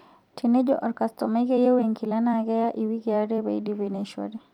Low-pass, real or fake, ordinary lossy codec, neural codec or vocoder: 19.8 kHz; fake; none; vocoder, 44.1 kHz, 128 mel bands every 256 samples, BigVGAN v2